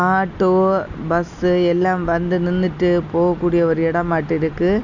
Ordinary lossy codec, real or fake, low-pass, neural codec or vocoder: none; real; 7.2 kHz; none